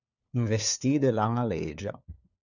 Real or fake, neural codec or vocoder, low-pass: fake; codec, 16 kHz, 4 kbps, FunCodec, trained on LibriTTS, 50 frames a second; 7.2 kHz